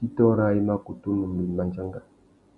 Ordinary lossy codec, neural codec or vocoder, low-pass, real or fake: MP3, 64 kbps; none; 10.8 kHz; real